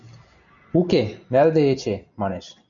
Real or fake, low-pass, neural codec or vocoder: real; 7.2 kHz; none